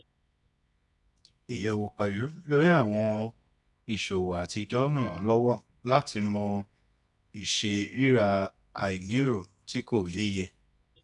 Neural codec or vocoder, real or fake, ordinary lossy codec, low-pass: codec, 24 kHz, 0.9 kbps, WavTokenizer, medium music audio release; fake; none; 10.8 kHz